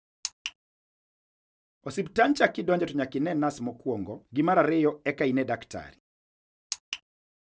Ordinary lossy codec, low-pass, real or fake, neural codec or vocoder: none; none; real; none